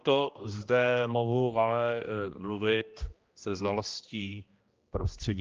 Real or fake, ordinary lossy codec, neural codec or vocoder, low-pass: fake; Opus, 24 kbps; codec, 16 kHz, 1 kbps, X-Codec, HuBERT features, trained on general audio; 7.2 kHz